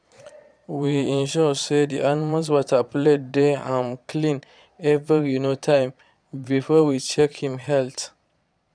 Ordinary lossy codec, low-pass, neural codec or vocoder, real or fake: none; 9.9 kHz; vocoder, 22.05 kHz, 80 mel bands, Vocos; fake